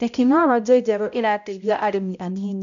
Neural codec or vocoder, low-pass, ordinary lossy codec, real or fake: codec, 16 kHz, 0.5 kbps, X-Codec, HuBERT features, trained on balanced general audio; 7.2 kHz; none; fake